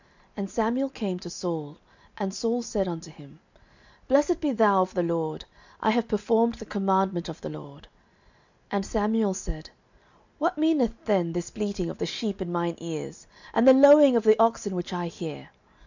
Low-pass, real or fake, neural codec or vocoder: 7.2 kHz; real; none